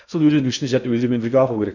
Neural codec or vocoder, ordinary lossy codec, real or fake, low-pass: codec, 16 kHz in and 24 kHz out, 0.8 kbps, FocalCodec, streaming, 65536 codes; none; fake; 7.2 kHz